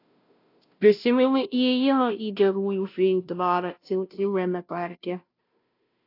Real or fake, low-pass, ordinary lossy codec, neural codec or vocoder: fake; 5.4 kHz; AAC, 32 kbps; codec, 16 kHz, 0.5 kbps, FunCodec, trained on Chinese and English, 25 frames a second